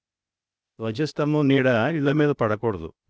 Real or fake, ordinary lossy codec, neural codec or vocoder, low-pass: fake; none; codec, 16 kHz, 0.8 kbps, ZipCodec; none